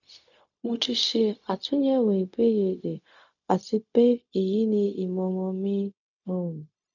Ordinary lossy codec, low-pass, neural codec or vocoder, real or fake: none; 7.2 kHz; codec, 16 kHz, 0.4 kbps, LongCat-Audio-Codec; fake